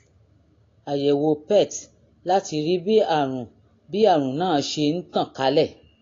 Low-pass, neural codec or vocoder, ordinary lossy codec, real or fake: 7.2 kHz; none; AAC, 32 kbps; real